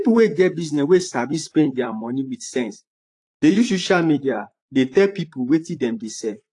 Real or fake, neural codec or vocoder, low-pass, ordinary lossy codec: fake; vocoder, 44.1 kHz, 128 mel bands, Pupu-Vocoder; 10.8 kHz; AAC, 48 kbps